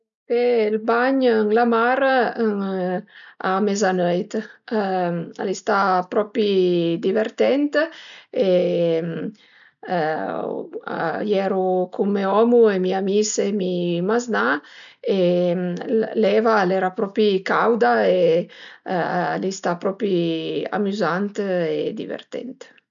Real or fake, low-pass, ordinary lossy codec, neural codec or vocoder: real; 7.2 kHz; none; none